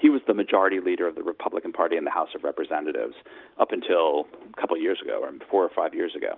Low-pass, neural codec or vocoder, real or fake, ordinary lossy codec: 5.4 kHz; none; real; Opus, 32 kbps